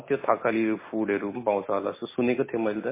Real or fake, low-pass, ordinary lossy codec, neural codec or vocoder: real; 3.6 kHz; MP3, 16 kbps; none